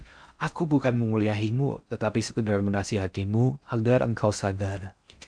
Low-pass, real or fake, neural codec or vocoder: 9.9 kHz; fake; codec, 16 kHz in and 24 kHz out, 0.6 kbps, FocalCodec, streaming, 4096 codes